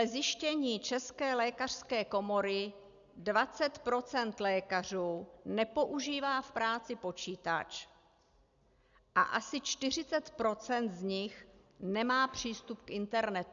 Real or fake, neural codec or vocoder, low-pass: real; none; 7.2 kHz